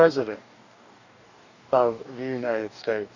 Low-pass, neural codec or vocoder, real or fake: 7.2 kHz; codec, 44.1 kHz, 2.6 kbps, DAC; fake